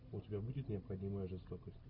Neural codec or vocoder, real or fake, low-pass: none; real; 5.4 kHz